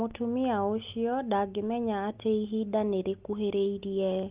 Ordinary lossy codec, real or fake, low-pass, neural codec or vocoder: Opus, 32 kbps; real; 3.6 kHz; none